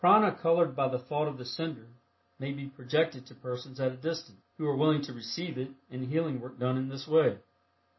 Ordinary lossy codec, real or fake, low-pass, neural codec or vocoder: MP3, 24 kbps; real; 7.2 kHz; none